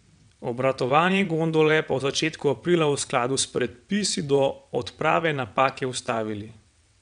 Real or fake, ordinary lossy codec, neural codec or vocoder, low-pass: fake; none; vocoder, 22.05 kHz, 80 mel bands, WaveNeXt; 9.9 kHz